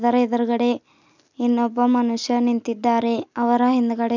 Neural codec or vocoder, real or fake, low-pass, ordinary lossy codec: none; real; 7.2 kHz; none